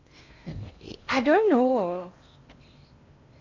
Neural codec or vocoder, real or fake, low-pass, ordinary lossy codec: codec, 16 kHz in and 24 kHz out, 0.8 kbps, FocalCodec, streaming, 65536 codes; fake; 7.2 kHz; AAC, 48 kbps